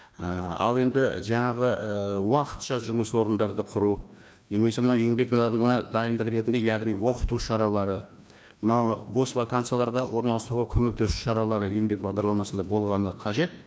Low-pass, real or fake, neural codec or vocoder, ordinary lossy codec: none; fake; codec, 16 kHz, 1 kbps, FreqCodec, larger model; none